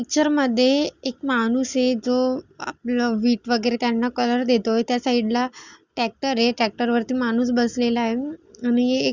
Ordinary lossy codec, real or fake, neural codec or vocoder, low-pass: Opus, 64 kbps; real; none; 7.2 kHz